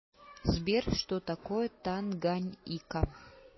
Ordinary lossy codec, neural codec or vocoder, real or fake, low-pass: MP3, 24 kbps; none; real; 7.2 kHz